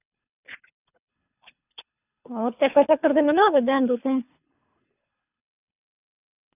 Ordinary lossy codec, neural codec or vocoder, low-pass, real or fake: none; codec, 24 kHz, 3 kbps, HILCodec; 3.6 kHz; fake